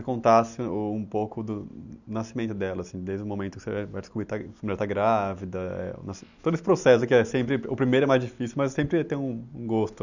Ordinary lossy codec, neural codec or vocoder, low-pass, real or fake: none; none; 7.2 kHz; real